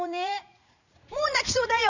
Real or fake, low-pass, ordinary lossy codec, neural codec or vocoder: fake; 7.2 kHz; none; vocoder, 44.1 kHz, 80 mel bands, Vocos